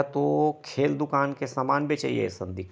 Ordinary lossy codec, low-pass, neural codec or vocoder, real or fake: none; none; none; real